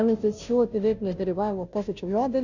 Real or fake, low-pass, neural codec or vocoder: fake; 7.2 kHz; codec, 16 kHz, 0.5 kbps, FunCodec, trained on Chinese and English, 25 frames a second